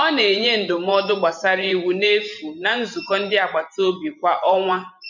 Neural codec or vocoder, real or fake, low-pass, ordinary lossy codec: vocoder, 44.1 kHz, 128 mel bands every 512 samples, BigVGAN v2; fake; 7.2 kHz; none